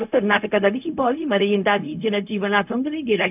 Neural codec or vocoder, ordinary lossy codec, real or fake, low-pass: codec, 16 kHz, 0.4 kbps, LongCat-Audio-Codec; none; fake; 3.6 kHz